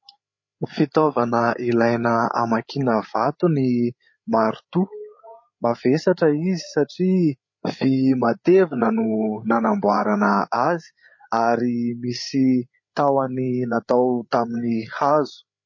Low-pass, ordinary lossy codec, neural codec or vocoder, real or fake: 7.2 kHz; MP3, 32 kbps; codec, 16 kHz, 8 kbps, FreqCodec, larger model; fake